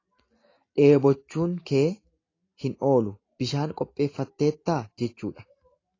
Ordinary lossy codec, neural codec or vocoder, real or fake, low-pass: AAC, 32 kbps; none; real; 7.2 kHz